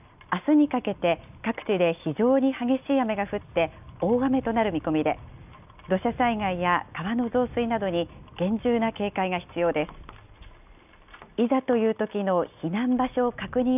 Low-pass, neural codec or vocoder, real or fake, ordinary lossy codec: 3.6 kHz; none; real; none